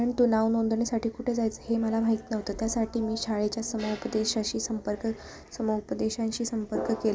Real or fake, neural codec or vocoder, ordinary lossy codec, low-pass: real; none; none; none